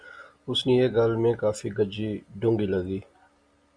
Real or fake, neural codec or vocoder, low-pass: real; none; 9.9 kHz